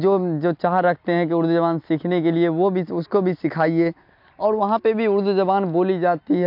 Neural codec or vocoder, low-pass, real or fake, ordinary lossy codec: none; 5.4 kHz; real; MP3, 48 kbps